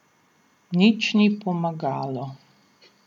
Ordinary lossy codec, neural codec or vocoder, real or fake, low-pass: none; none; real; 19.8 kHz